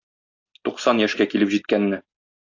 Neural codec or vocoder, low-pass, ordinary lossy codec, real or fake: none; 7.2 kHz; AAC, 48 kbps; real